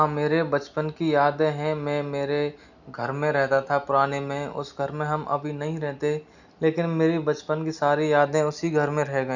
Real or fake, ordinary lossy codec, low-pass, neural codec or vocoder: real; none; 7.2 kHz; none